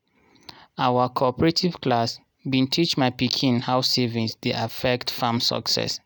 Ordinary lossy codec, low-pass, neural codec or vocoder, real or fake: none; none; none; real